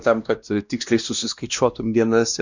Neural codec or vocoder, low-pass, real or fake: codec, 16 kHz, 1 kbps, X-Codec, WavLM features, trained on Multilingual LibriSpeech; 7.2 kHz; fake